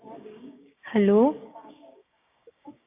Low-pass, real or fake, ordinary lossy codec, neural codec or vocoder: 3.6 kHz; fake; AAC, 24 kbps; codec, 16 kHz, 6 kbps, DAC